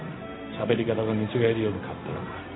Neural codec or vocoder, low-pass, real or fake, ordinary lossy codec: codec, 16 kHz, 0.4 kbps, LongCat-Audio-Codec; 7.2 kHz; fake; AAC, 16 kbps